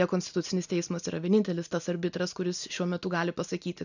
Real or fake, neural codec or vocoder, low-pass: real; none; 7.2 kHz